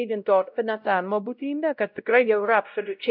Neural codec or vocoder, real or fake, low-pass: codec, 16 kHz, 0.5 kbps, X-Codec, WavLM features, trained on Multilingual LibriSpeech; fake; 5.4 kHz